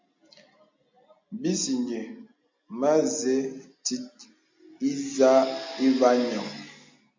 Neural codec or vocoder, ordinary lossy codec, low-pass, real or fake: none; MP3, 48 kbps; 7.2 kHz; real